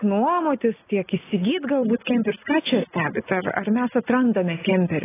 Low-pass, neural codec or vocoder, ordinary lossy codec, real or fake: 3.6 kHz; none; AAC, 16 kbps; real